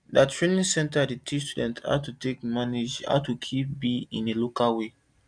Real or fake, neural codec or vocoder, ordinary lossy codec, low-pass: real; none; none; 9.9 kHz